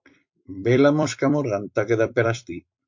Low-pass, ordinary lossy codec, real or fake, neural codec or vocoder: 7.2 kHz; MP3, 48 kbps; fake; vocoder, 44.1 kHz, 128 mel bands every 256 samples, BigVGAN v2